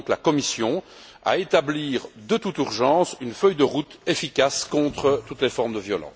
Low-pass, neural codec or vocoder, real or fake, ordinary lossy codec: none; none; real; none